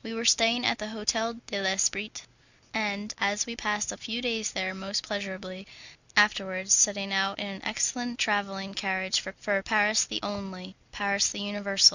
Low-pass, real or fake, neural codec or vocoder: 7.2 kHz; real; none